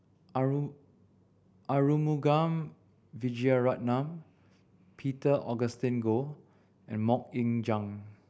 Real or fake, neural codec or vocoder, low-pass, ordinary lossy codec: real; none; none; none